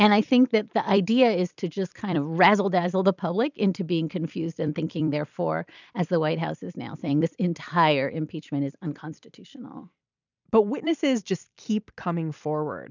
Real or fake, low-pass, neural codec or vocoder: fake; 7.2 kHz; vocoder, 44.1 kHz, 128 mel bands every 256 samples, BigVGAN v2